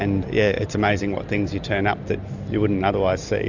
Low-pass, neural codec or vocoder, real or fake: 7.2 kHz; none; real